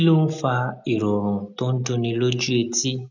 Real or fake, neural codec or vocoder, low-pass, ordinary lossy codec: real; none; 7.2 kHz; none